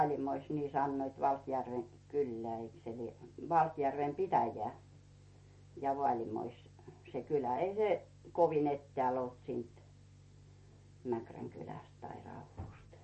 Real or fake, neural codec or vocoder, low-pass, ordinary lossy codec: real; none; 10.8 kHz; MP3, 32 kbps